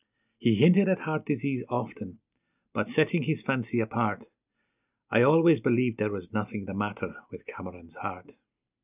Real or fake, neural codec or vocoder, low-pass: real; none; 3.6 kHz